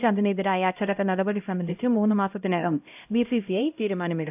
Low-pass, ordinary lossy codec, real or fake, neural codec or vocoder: 3.6 kHz; AAC, 32 kbps; fake; codec, 16 kHz, 0.5 kbps, X-Codec, HuBERT features, trained on LibriSpeech